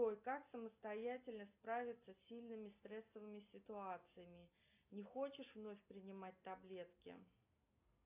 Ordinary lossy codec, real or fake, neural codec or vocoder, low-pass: AAC, 32 kbps; real; none; 3.6 kHz